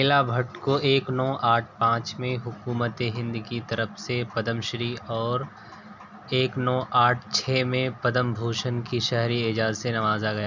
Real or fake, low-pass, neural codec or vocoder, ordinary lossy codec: real; 7.2 kHz; none; none